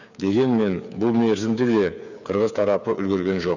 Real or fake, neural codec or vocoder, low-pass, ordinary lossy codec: fake; codec, 16 kHz, 8 kbps, FreqCodec, smaller model; 7.2 kHz; none